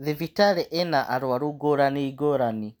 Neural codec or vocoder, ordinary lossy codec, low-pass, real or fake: none; none; none; real